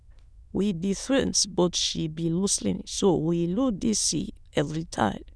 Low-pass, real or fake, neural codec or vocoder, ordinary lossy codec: none; fake; autoencoder, 22.05 kHz, a latent of 192 numbers a frame, VITS, trained on many speakers; none